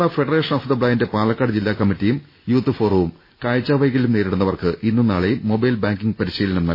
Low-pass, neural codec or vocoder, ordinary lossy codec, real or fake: 5.4 kHz; none; MP3, 24 kbps; real